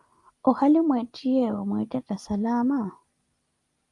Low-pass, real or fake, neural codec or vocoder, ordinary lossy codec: 10.8 kHz; real; none; Opus, 32 kbps